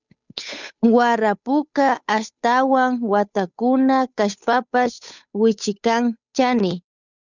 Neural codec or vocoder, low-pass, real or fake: codec, 16 kHz, 8 kbps, FunCodec, trained on Chinese and English, 25 frames a second; 7.2 kHz; fake